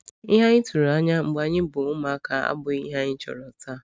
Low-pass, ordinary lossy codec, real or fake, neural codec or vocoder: none; none; real; none